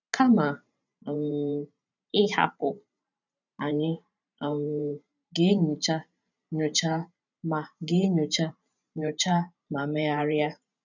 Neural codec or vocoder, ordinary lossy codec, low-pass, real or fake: vocoder, 44.1 kHz, 128 mel bands every 512 samples, BigVGAN v2; none; 7.2 kHz; fake